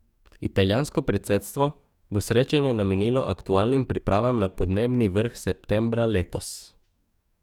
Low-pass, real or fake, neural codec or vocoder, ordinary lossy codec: 19.8 kHz; fake; codec, 44.1 kHz, 2.6 kbps, DAC; none